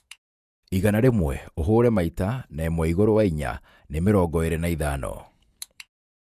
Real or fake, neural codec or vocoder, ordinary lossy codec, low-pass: fake; vocoder, 48 kHz, 128 mel bands, Vocos; none; 14.4 kHz